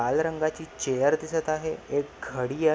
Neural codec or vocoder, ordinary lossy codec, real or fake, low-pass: none; none; real; none